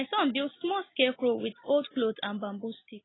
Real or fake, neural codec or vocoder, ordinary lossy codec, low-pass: real; none; AAC, 16 kbps; 7.2 kHz